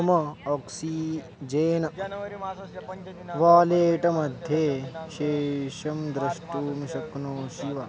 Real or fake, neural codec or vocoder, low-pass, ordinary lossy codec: real; none; none; none